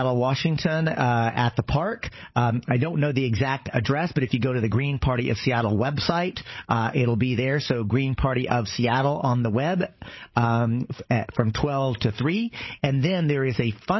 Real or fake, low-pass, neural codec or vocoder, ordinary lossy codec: fake; 7.2 kHz; codec, 16 kHz, 8 kbps, FreqCodec, larger model; MP3, 24 kbps